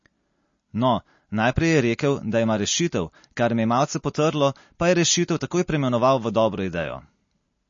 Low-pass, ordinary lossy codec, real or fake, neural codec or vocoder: 7.2 kHz; MP3, 32 kbps; real; none